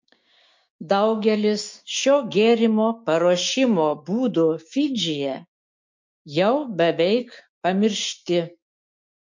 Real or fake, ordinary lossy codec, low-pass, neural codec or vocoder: fake; MP3, 48 kbps; 7.2 kHz; codec, 16 kHz, 6 kbps, DAC